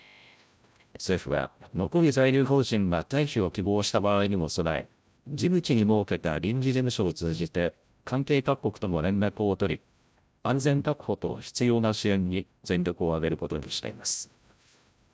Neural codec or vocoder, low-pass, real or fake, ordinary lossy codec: codec, 16 kHz, 0.5 kbps, FreqCodec, larger model; none; fake; none